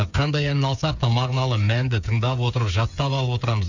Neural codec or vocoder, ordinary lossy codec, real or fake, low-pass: codec, 16 kHz, 8 kbps, FreqCodec, smaller model; none; fake; 7.2 kHz